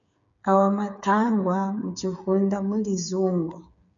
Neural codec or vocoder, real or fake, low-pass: codec, 16 kHz, 8 kbps, FreqCodec, smaller model; fake; 7.2 kHz